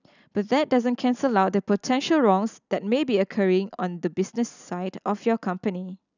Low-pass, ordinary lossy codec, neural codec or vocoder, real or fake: 7.2 kHz; none; none; real